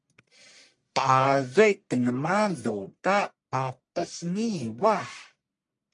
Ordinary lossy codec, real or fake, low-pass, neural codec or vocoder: MP3, 64 kbps; fake; 10.8 kHz; codec, 44.1 kHz, 1.7 kbps, Pupu-Codec